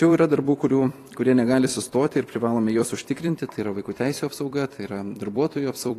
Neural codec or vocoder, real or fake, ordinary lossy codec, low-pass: vocoder, 44.1 kHz, 128 mel bands every 256 samples, BigVGAN v2; fake; AAC, 48 kbps; 14.4 kHz